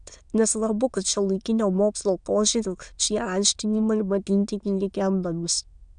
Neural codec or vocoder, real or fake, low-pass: autoencoder, 22.05 kHz, a latent of 192 numbers a frame, VITS, trained on many speakers; fake; 9.9 kHz